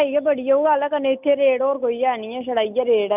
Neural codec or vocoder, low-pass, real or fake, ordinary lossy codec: none; 3.6 kHz; real; none